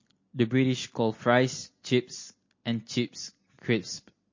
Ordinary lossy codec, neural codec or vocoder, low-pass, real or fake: MP3, 32 kbps; none; 7.2 kHz; real